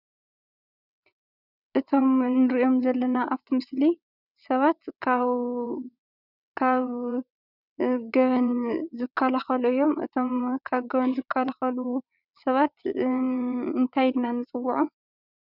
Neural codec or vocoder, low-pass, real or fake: vocoder, 22.05 kHz, 80 mel bands, WaveNeXt; 5.4 kHz; fake